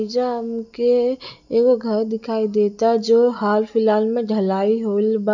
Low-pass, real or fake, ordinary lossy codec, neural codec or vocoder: 7.2 kHz; real; none; none